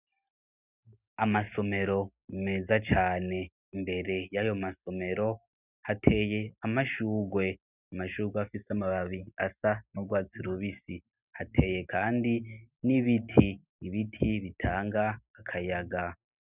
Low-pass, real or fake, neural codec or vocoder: 3.6 kHz; real; none